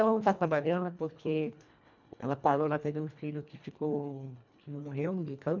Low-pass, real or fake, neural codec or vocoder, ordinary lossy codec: 7.2 kHz; fake; codec, 24 kHz, 1.5 kbps, HILCodec; none